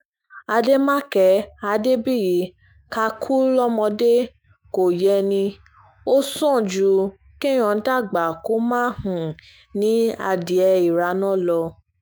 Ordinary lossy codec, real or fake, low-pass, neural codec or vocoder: none; fake; none; autoencoder, 48 kHz, 128 numbers a frame, DAC-VAE, trained on Japanese speech